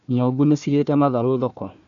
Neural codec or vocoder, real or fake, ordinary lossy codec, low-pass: codec, 16 kHz, 1 kbps, FunCodec, trained on Chinese and English, 50 frames a second; fake; none; 7.2 kHz